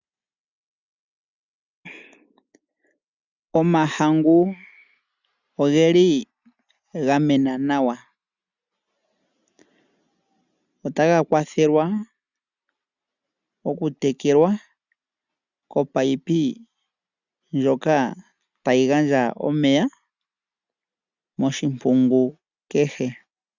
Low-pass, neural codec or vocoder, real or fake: 7.2 kHz; none; real